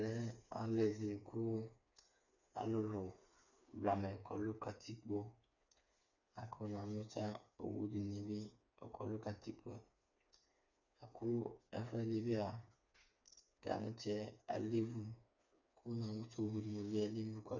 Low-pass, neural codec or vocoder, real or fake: 7.2 kHz; codec, 16 kHz, 4 kbps, FreqCodec, smaller model; fake